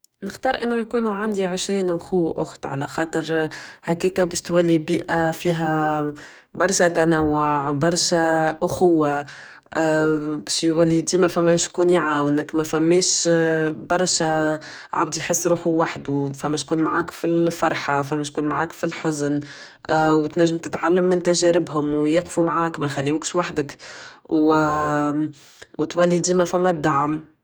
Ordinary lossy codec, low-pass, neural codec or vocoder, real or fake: none; none; codec, 44.1 kHz, 2.6 kbps, DAC; fake